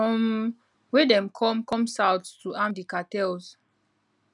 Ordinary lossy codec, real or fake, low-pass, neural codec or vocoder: none; real; 10.8 kHz; none